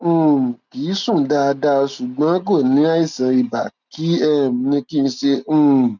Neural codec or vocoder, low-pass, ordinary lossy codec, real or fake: none; 7.2 kHz; none; real